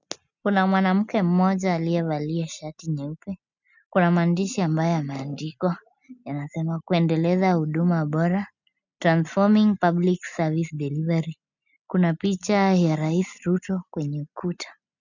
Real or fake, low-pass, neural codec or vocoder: real; 7.2 kHz; none